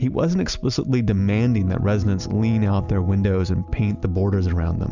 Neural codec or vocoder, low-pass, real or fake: none; 7.2 kHz; real